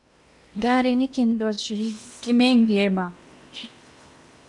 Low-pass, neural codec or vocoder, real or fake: 10.8 kHz; codec, 16 kHz in and 24 kHz out, 0.8 kbps, FocalCodec, streaming, 65536 codes; fake